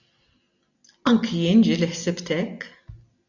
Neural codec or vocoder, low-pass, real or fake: none; 7.2 kHz; real